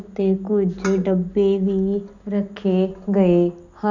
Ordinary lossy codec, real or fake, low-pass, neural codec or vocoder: none; real; 7.2 kHz; none